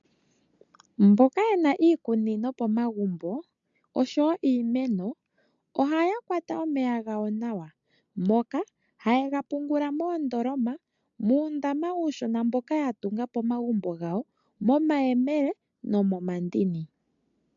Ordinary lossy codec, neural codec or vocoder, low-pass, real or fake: MP3, 64 kbps; none; 7.2 kHz; real